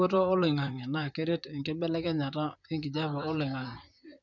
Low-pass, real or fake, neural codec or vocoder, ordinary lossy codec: 7.2 kHz; fake; vocoder, 44.1 kHz, 128 mel bands, Pupu-Vocoder; none